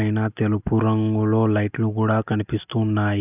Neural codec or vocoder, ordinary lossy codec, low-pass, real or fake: none; none; 3.6 kHz; real